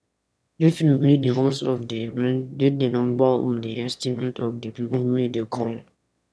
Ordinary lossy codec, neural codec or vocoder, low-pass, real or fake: none; autoencoder, 22.05 kHz, a latent of 192 numbers a frame, VITS, trained on one speaker; none; fake